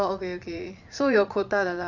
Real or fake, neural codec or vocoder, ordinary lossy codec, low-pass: fake; vocoder, 22.05 kHz, 80 mel bands, Vocos; none; 7.2 kHz